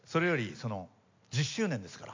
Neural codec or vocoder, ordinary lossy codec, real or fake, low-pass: none; MP3, 64 kbps; real; 7.2 kHz